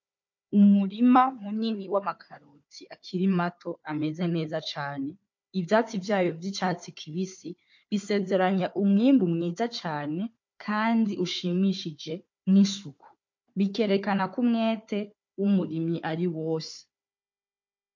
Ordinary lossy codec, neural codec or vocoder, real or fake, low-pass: MP3, 48 kbps; codec, 16 kHz, 4 kbps, FunCodec, trained on Chinese and English, 50 frames a second; fake; 7.2 kHz